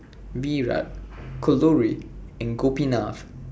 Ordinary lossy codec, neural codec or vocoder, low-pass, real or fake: none; none; none; real